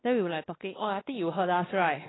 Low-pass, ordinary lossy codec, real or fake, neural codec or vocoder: 7.2 kHz; AAC, 16 kbps; fake; codec, 16 kHz, 2 kbps, X-Codec, WavLM features, trained on Multilingual LibriSpeech